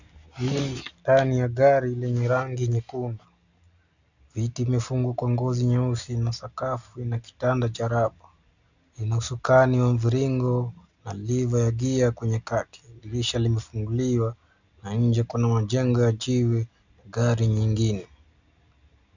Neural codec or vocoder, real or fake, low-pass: none; real; 7.2 kHz